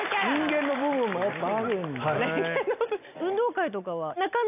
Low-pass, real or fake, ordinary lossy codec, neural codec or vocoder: 3.6 kHz; real; AAC, 32 kbps; none